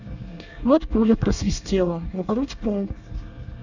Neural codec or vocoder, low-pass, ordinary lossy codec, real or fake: codec, 24 kHz, 1 kbps, SNAC; 7.2 kHz; none; fake